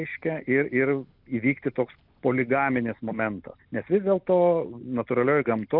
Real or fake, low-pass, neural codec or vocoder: real; 5.4 kHz; none